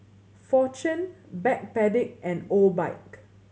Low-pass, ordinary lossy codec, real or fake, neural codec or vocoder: none; none; real; none